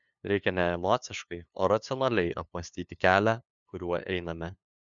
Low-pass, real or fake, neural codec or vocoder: 7.2 kHz; fake; codec, 16 kHz, 2 kbps, FunCodec, trained on LibriTTS, 25 frames a second